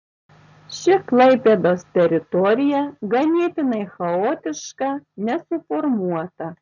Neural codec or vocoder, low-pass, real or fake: none; 7.2 kHz; real